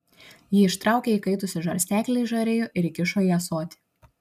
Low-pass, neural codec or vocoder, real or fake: 14.4 kHz; none; real